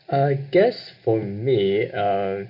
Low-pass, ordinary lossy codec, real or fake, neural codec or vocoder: 5.4 kHz; none; real; none